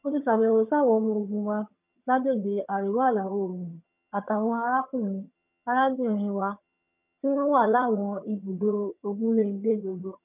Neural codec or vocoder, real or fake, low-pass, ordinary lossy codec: vocoder, 22.05 kHz, 80 mel bands, HiFi-GAN; fake; 3.6 kHz; none